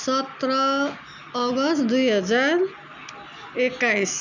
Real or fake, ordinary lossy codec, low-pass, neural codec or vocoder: real; none; 7.2 kHz; none